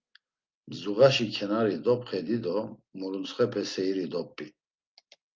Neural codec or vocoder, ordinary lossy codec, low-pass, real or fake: none; Opus, 32 kbps; 7.2 kHz; real